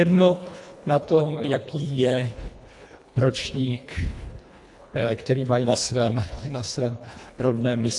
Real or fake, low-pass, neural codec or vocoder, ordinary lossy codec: fake; 10.8 kHz; codec, 24 kHz, 1.5 kbps, HILCodec; AAC, 64 kbps